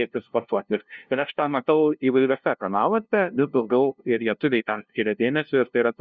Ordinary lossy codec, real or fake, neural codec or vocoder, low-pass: Opus, 64 kbps; fake; codec, 16 kHz, 0.5 kbps, FunCodec, trained on LibriTTS, 25 frames a second; 7.2 kHz